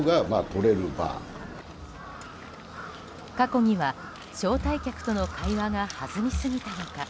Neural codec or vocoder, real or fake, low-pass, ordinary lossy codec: none; real; none; none